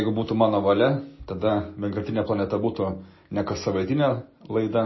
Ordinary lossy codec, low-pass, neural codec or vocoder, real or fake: MP3, 24 kbps; 7.2 kHz; none; real